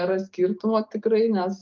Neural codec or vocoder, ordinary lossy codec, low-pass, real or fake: none; Opus, 32 kbps; 7.2 kHz; real